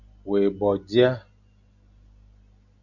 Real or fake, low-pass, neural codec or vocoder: real; 7.2 kHz; none